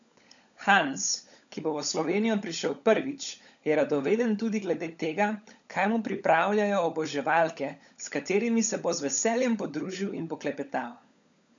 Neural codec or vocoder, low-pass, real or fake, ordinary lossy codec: codec, 16 kHz, 16 kbps, FunCodec, trained on LibriTTS, 50 frames a second; 7.2 kHz; fake; none